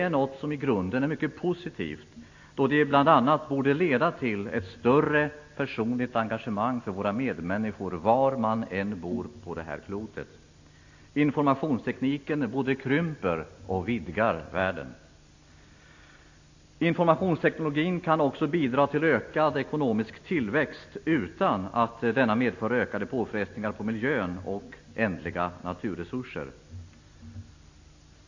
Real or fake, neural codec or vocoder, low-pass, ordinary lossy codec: real; none; 7.2 kHz; AAC, 48 kbps